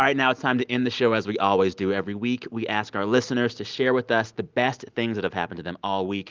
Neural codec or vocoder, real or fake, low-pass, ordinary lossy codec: none; real; 7.2 kHz; Opus, 32 kbps